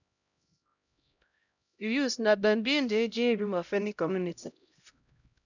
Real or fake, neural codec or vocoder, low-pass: fake; codec, 16 kHz, 0.5 kbps, X-Codec, HuBERT features, trained on LibriSpeech; 7.2 kHz